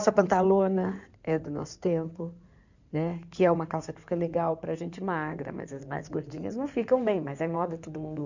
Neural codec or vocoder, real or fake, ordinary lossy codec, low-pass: codec, 16 kHz in and 24 kHz out, 2.2 kbps, FireRedTTS-2 codec; fake; none; 7.2 kHz